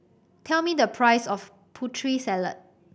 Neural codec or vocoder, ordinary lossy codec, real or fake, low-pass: none; none; real; none